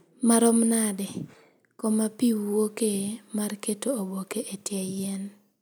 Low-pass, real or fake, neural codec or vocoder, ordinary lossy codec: none; real; none; none